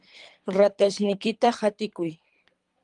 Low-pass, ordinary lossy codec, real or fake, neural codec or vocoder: 10.8 kHz; Opus, 32 kbps; fake; codec, 24 kHz, 3 kbps, HILCodec